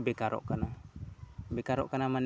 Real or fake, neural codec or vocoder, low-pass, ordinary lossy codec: real; none; none; none